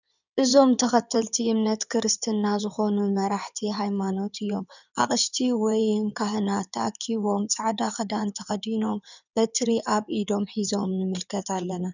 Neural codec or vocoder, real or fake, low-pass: codec, 16 kHz in and 24 kHz out, 2.2 kbps, FireRedTTS-2 codec; fake; 7.2 kHz